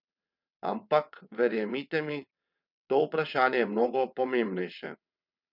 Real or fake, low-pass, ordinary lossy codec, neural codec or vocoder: fake; 5.4 kHz; none; vocoder, 22.05 kHz, 80 mel bands, WaveNeXt